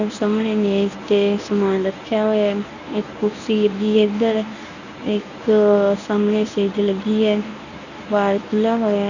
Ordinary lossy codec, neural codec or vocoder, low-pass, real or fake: none; codec, 24 kHz, 0.9 kbps, WavTokenizer, medium speech release version 1; 7.2 kHz; fake